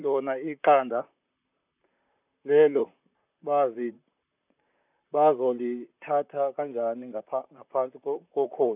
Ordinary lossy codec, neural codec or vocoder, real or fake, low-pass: none; vocoder, 44.1 kHz, 128 mel bands, Pupu-Vocoder; fake; 3.6 kHz